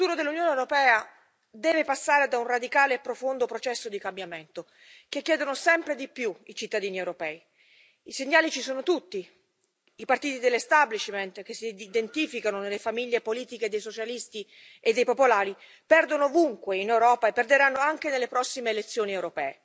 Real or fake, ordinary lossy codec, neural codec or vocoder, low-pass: real; none; none; none